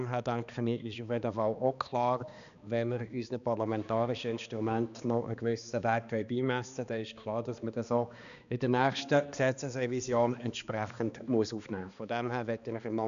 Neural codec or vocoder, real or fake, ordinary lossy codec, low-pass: codec, 16 kHz, 2 kbps, X-Codec, HuBERT features, trained on balanced general audio; fake; none; 7.2 kHz